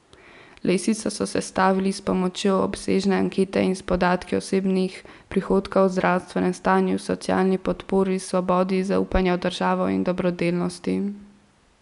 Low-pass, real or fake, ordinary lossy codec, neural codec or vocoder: 10.8 kHz; real; none; none